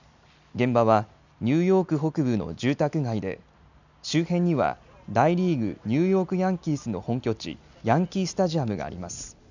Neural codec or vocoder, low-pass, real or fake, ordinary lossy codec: none; 7.2 kHz; real; none